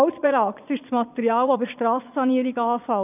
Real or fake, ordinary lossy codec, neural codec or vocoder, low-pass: fake; none; vocoder, 22.05 kHz, 80 mel bands, Vocos; 3.6 kHz